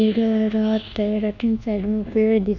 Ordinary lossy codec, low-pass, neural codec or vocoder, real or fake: none; 7.2 kHz; codec, 24 kHz, 1.2 kbps, DualCodec; fake